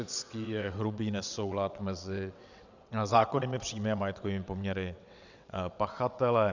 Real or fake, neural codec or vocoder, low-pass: fake; vocoder, 22.05 kHz, 80 mel bands, WaveNeXt; 7.2 kHz